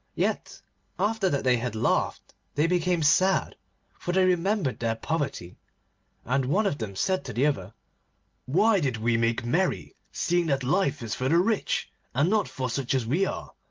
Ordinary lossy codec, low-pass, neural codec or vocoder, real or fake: Opus, 32 kbps; 7.2 kHz; none; real